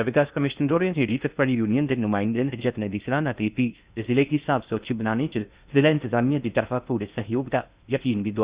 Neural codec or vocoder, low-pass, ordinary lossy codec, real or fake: codec, 16 kHz in and 24 kHz out, 0.6 kbps, FocalCodec, streaming, 2048 codes; 3.6 kHz; Opus, 64 kbps; fake